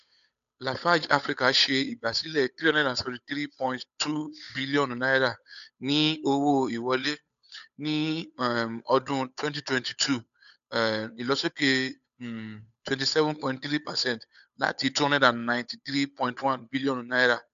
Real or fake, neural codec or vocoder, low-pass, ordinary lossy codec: fake; codec, 16 kHz, 8 kbps, FunCodec, trained on Chinese and English, 25 frames a second; 7.2 kHz; none